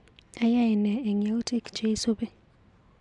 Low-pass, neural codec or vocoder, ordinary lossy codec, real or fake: 10.8 kHz; none; MP3, 96 kbps; real